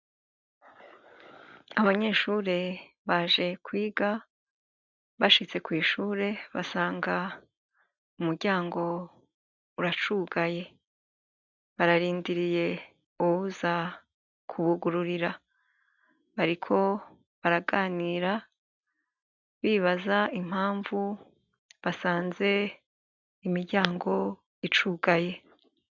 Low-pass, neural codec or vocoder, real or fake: 7.2 kHz; none; real